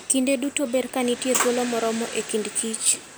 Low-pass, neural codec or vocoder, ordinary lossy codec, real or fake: none; none; none; real